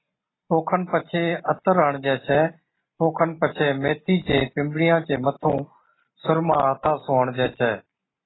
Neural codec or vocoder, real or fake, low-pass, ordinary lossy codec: none; real; 7.2 kHz; AAC, 16 kbps